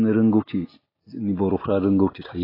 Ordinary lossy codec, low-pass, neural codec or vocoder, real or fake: AAC, 24 kbps; 5.4 kHz; none; real